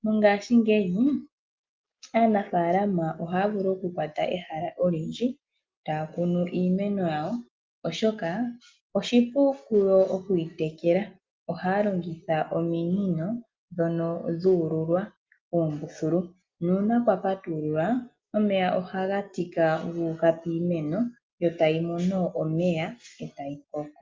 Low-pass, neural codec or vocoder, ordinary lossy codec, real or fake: 7.2 kHz; none; Opus, 32 kbps; real